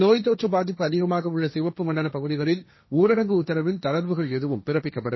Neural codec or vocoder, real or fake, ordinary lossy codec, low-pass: codec, 16 kHz, 1.1 kbps, Voila-Tokenizer; fake; MP3, 24 kbps; 7.2 kHz